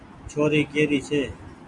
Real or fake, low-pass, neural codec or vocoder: real; 10.8 kHz; none